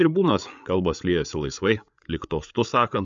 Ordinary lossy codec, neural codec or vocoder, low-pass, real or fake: MP3, 64 kbps; codec, 16 kHz, 8 kbps, FreqCodec, larger model; 7.2 kHz; fake